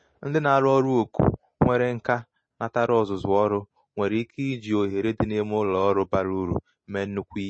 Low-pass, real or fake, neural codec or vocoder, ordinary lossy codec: 9.9 kHz; real; none; MP3, 32 kbps